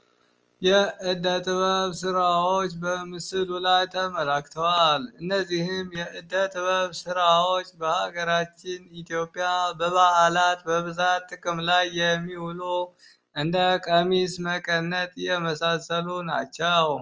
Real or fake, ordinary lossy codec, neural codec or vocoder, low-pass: real; Opus, 24 kbps; none; 7.2 kHz